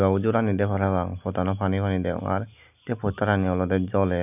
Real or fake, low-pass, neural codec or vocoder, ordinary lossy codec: real; 3.6 kHz; none; none